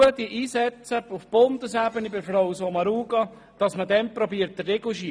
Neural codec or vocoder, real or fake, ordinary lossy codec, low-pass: none; real; none; none